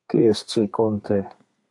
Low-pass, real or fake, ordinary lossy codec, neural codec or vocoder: 10.8 kHz; fake; AAC, 64 kbps; codec, 32 kHz, 1.9 kbps, SNAC